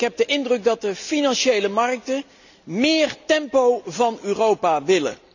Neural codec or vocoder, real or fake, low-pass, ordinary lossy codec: none; real; 7.2 kHz; none